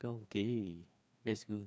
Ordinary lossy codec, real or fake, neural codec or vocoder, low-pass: none; fake; codec, 16 kHz, 2 kbps, FreqCodec, larger model; none